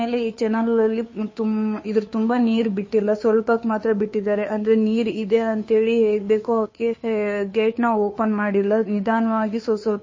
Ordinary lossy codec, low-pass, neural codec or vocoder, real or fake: MP3, 32 kbps; 7.2 kHz; codec, 16 kHz, 2 kbps, FunCodec, trained on Chinese and English, 25 frames a second; fake